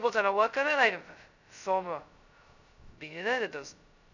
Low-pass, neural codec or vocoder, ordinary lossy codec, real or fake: 7.2 kHz; codec, 16 kHz, 0.2 kbps, FocalCodec; none; fake